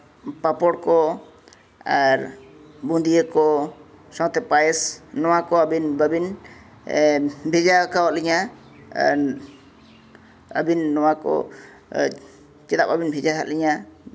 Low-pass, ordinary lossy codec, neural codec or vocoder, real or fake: none; none; none; real